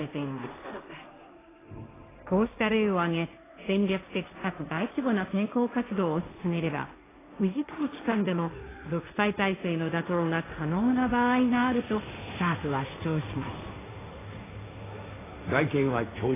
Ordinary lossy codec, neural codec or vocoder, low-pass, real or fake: AAC, 16 kbps; codec, 16 kHz, 1.1 kbps, Voila-Tokenizer; 3.6 kHz; fake